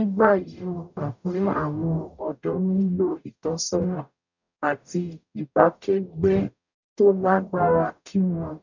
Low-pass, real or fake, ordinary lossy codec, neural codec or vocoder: 7.2 kHz; fake; none; codec, 44.1 kHz, 0.9 kbps, DAC